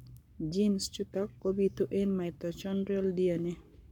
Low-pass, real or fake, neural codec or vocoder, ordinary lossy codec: 19.8 kHz; fake; codec, 44.1 kHz, 7.8 kbps, Pupu-Codec; none